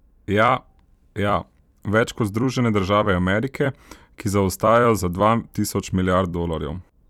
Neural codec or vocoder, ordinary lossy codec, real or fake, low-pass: vocoder, 44.1 kHz, 128 mel bands every 256 samples, BigVGAN v2; none; fake; 19.8 kHz